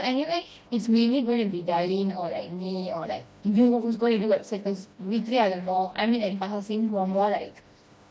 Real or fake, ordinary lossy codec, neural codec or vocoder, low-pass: fake; none; codec, 16 kHz, 1 kbps, FreqCodec, smaller model; none